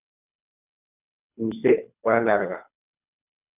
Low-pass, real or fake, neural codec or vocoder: 3.6 kHz; fake; codec, 24 kHz, 3 kbps, HILCodec